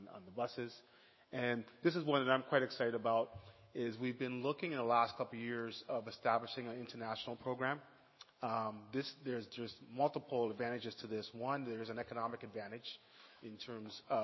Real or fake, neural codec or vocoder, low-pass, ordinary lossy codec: fake; autoencoder, 48 kHz, 128 numbers a frame, DAC-VAE, trained on Japanese speech; 7.2 kHz; MP3, 24 kbps